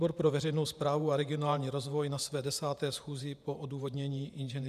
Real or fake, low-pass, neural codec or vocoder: fake; 14.4 kHz; vocoder, 48 kHz, 128 mel bands, Vocos